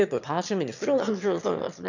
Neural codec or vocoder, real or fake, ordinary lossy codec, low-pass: autoencoder, 22.05 kHz, a latent of 192 numbers a frame, VITS, trained on one speaker; fake; none; 7.2 kHz